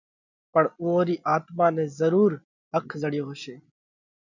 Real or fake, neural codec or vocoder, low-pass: real; none; 7.2 kHz